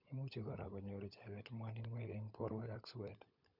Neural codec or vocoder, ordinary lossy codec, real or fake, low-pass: codec, 16 kHz, 16 kbps, FunCodec, trained on LibriTTS, 50 frames a second; none; fake; 5.4 kHz